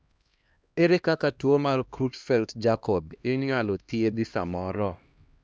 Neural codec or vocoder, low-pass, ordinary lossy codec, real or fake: codec, 16 kHz, 1 kbps, X-Codec, HuBERT features, trained on LibriSpeech; none; none; fake